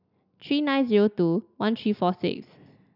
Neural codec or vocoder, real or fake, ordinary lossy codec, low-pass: none; real; none; 5.4 kHz